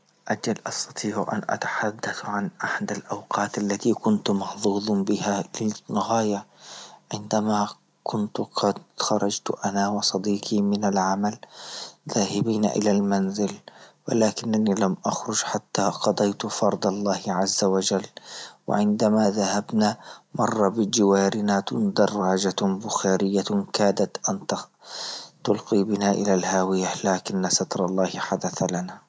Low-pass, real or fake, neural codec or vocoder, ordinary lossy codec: none; real; none; none